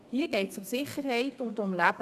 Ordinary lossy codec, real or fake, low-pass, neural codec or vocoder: none; fake; 14.4 kHz; codec, 32 kHz, 1.9 kbps, SNAC